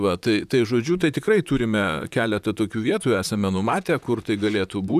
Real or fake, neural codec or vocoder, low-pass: fake; vocoder, 44.1 kHz, 128 mel bands, Pupu-Vocoder; 14.4 kHz